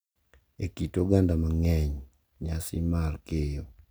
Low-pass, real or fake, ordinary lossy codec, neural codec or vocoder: none; real; none; none